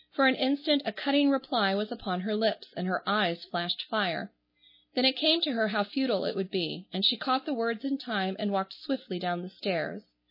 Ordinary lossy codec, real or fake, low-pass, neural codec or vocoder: MP3, 24 kbps; real; 5.4 kHz; none